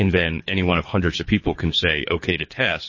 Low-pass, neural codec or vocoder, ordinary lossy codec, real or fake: 7.2 kHz; codec, 24 kHz, 3 kbps, HILCodec; MP3, 32 kbps; fake